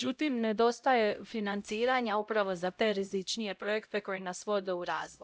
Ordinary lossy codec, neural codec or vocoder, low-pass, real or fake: none; codec, 16 kHz, 0.5 kbps, X-Codec, HuBERT features, trained on LibriSpeech; none; fake